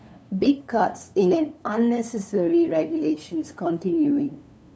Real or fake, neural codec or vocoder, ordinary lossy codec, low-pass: fake; codec, 16 kHz, 2 kbps, FunCodec, trained on LibriTTS, 25 frames a second; none; none